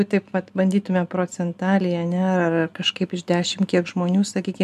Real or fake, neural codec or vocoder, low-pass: real; none; 14.4 kHz